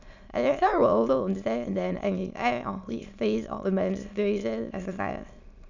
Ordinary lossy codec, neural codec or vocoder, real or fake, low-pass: none; autoencoder, 22.05 kHz, a latent of 192 numbers a frame, VITS, trained on many speakers; fake; 7.2 kHz